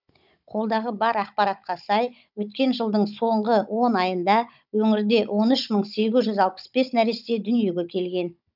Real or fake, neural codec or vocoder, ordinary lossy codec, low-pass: fake; codec, 16 kHz, 16 kbps, FunCodec, trained on Chinese and English, 50 frames a second; none; 5.4 kHz